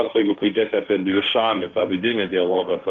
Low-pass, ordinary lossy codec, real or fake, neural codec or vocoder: 7.2 kHz; Opus, 24 kbps; fake; codec, 16 kHz, 1.1 kbps, Voila-Tokenizer